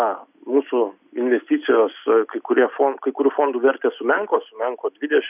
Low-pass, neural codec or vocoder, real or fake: 3.6 kHz; none; real